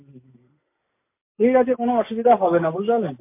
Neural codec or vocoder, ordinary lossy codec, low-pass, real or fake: none; MP3, 24 kbps; 3.6 kHz; real